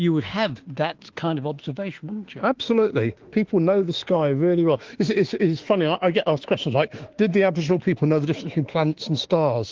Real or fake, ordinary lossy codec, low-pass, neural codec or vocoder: fake; Opus, 16 kbps; 7.2 kHz; autoencoder, 48 kHz, 32 numbers a frame, DAC-VAE, trained on Japanese speech